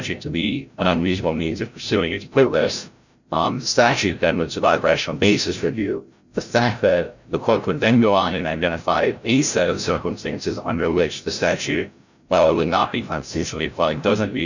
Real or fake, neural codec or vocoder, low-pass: fake; codec, 16 kHz, 0.5 kbps, FreqCodec, larger model; 7.2 kHz